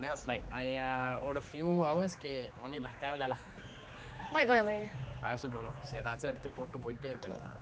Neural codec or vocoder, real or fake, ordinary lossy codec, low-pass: codec, 16 kHz, 2 kbps, X-Codec, HuBERT features, trained on general audio; fake; none; none